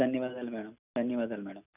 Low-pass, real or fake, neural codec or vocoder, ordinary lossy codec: 3.6 kHz; real; none; none